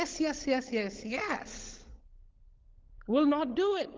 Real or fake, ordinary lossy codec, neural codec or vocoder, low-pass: fake; Opus, 16 kbps; codec, 16 kHz, 16 kbps, FunCodec, trained on LibriTTS, 50 frames a second; 7.2 kHz